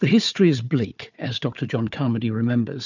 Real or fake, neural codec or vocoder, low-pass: fake; codec, 16 kHz, 4 kbps, FunCodec, trained on Chinese and English, 50 frames a second; 7.2 kHz